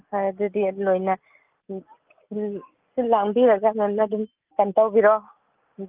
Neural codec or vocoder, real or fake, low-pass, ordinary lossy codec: none; real; 3.6 kHz; Opus, 32 kbps